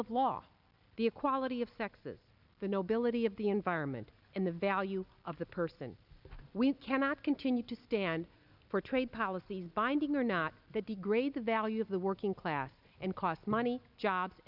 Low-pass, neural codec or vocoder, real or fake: 5.4 kHz; none; real